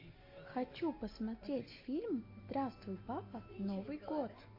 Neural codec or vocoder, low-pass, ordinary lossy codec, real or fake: none; 5.4 kHz; MP3, 32 kbps; real